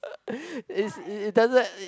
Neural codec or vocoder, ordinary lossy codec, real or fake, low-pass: none; none; real; none